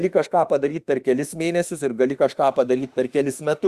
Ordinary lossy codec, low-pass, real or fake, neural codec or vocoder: Opus, 64 kbps; 14.4 kHz; fake; autoencoder, 48 kHz, 32 numbers a frame, DAC-VAE, trained on Japanese speech